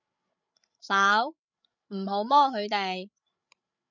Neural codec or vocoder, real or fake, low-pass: codec, 16 kHz, 16 kbps, FreqCodec, larger model; fake; 7.2 kHz